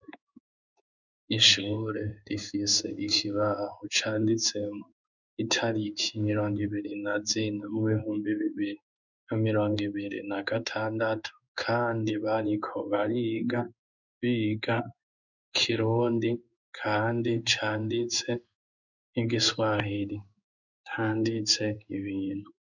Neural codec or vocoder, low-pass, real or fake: codec, 16 kHz in and 24 kHz out, 1 kbps, XY-Tokenizer; 7.2 kHz; fake